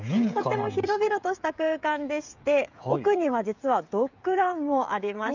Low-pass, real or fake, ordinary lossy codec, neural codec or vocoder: 7.2 kHz; fake; none; codec, 16 kHz, 16 kbps, FreqCodec, smaller model